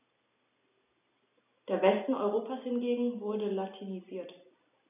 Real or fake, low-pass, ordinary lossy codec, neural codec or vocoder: real; 3.6 kHz; none; none